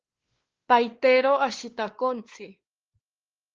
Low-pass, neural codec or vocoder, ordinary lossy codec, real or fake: 7.2 kHz; codec, 16 kHz, 2 kbps, X-Codec, WavLM features, trained on Multilingual LibriSpeech; Opus, 16 kbps; fake